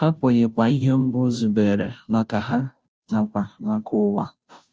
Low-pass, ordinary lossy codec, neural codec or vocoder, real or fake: none; none; codec, 16 kHz, 0.5 kbps, FunCodec, trained on Chinese and English, 25 frames a second; fake